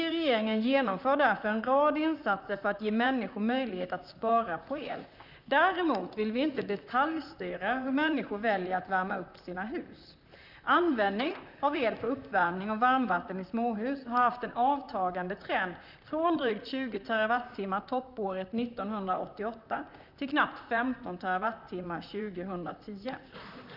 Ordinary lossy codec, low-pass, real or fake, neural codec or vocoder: none; 5.4 kHz; fake; vocoder, 44.1 kHz, 128 mel bands, Pupu-Vocoder